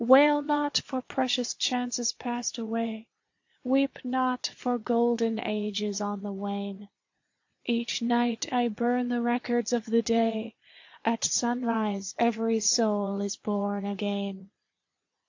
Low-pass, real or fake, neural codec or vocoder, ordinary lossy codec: 7.2 kHz; fake; vocoder, 22.05 kHz, 80 mel bands, Vocos; AAC, 48 kbps